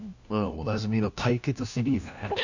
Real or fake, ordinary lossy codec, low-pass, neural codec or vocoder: fake; none; 7.2 kHz; codec, 16 kHz, 1 kbps, FunCodec, trained on LibriTTS, 50 frames a second